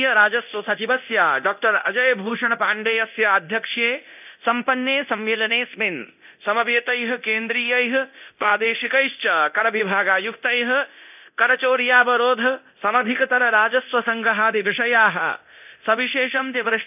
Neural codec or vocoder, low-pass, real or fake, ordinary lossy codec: codec, 24 kHz, 0.9 kbps, DualCodec; 3.6 kHz; fake; none